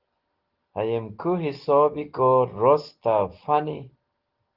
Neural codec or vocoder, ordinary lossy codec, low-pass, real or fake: none; Opus, 16 kbps; 5.4 kHz; real